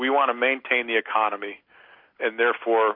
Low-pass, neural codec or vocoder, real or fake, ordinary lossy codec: 5.4 kHz; none; real; MP3, 32 kbps